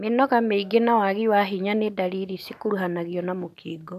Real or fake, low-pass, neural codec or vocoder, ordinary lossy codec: real; 14.4 kHz; none; none